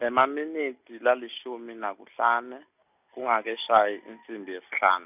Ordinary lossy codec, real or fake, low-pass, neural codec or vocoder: none; real; 3.6 kHz; none